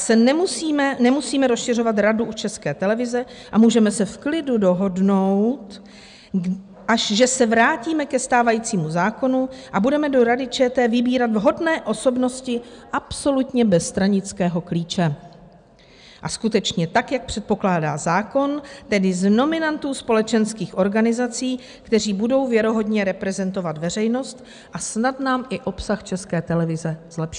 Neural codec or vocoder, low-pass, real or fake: none; 9.9 kHz; real